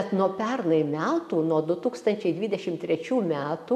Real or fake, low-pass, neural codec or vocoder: real; 14.4 kHz; none